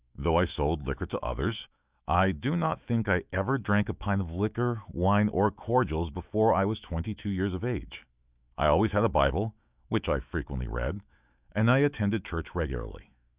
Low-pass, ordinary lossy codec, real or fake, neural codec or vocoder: 3.6 kHz; Opus, 64 kbps; fake; autoencoder, 48 kHz, 128 numbers a frame, DAC-VAE, trained on Japanese speech